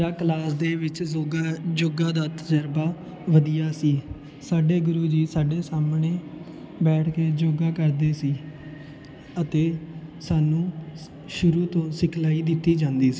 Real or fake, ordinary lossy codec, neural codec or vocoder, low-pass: real; none; none; none